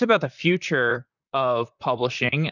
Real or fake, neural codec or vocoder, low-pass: fake; vocoder, 44.1 kHz, 128 mel bands, Pupu-Vocoder; 7.2 kHz